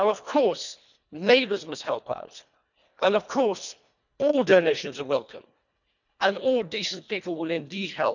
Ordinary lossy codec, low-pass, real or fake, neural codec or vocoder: none; 7.2 kHz; fake; codec, 24 kHz, 1.5 kbps, HILCodec